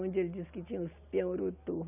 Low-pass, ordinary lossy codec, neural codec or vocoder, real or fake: 3.6 kHz; none; none; real